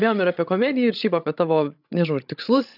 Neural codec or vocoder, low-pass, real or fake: codec, 16 kHz, 16 kbps, FreqCodec, larger model; 5.4 kHz; fake